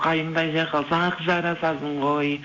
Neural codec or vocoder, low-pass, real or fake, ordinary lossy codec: none; 7.2 kHz; real; MP3, 64 kbps